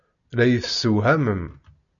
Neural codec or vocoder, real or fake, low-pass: none; real; 7.2 kHz